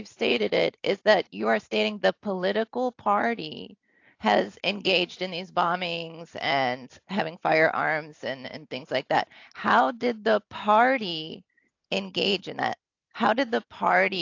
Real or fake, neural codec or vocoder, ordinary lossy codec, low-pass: real; none; AAC, 48 kbps; 7.2 kHz